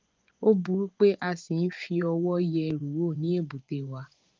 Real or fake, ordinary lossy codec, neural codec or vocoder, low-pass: fake; Opus, 32 kbps; vocoder, 24 kHz, 100 mel bands, Vocos; 7.2 kHz